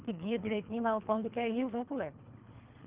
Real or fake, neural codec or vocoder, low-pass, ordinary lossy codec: fake; codec, 24 kHz, 3 kbps, HILCodec; 3.6 kHz; Opus, 32 kbps